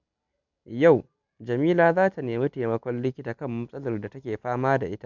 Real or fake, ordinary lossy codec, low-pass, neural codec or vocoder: real; none; 7.2 kHz; none